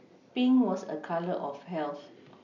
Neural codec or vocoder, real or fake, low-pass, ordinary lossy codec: none; real; 7.2 kHz; none